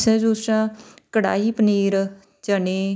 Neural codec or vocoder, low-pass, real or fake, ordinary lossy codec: none; none; real; none